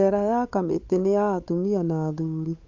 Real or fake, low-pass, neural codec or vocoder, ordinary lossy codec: fake; 7.2 kHz; codec, 16 kHz, 8 kbps, FunCodec, trained on Chinese and English, 25 frames a second; AAC, 48 kbps